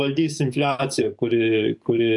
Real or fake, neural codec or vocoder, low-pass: fake; codec, 44.1 kHz, 7.8 kbps, DAC; 10.8 kHz